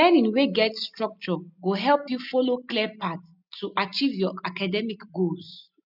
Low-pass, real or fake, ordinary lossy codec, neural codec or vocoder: 5.4 kHz; real; none; none